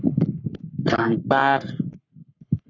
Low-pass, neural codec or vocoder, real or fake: 7.2 kHz; codec, 44.1 kHz, 3.4 kbps, Pupu-Codec; fake